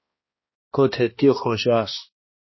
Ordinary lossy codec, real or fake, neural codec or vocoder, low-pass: MP3, 24 kbps; fake; codec, 16 kHz, 1 kbps, X-Codec, HuBERT features, trained on balanced general audio; 7.2 kHz